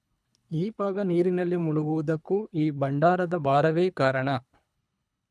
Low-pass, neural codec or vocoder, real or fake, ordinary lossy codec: none; codec, 24 kHz, 3 kbps, HILCodec; fake; none